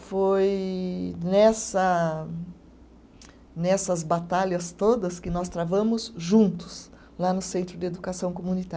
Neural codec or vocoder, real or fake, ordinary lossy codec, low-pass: none; real; none; none